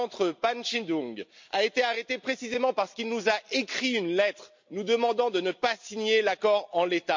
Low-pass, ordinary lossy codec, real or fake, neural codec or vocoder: 7.2 kHz; MP3, 48 kbps; real; none